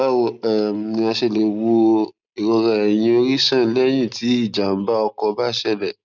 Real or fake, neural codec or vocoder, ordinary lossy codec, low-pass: real; none; none; 7.2 kHz